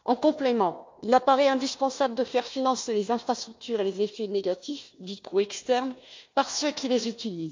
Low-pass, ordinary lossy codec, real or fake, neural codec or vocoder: 7.2 kHz; MP3, 48 kbps; fake; codec, 16 kHz, 1 kbps, FunCodec, trained on Chinese and English, 50 frames a second